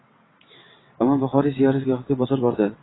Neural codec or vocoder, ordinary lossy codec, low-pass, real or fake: vocoder, 22.05 kHz, 80 mel bands, WaveNeXt; AAC, 16 kbps; 7.2 kHz; fake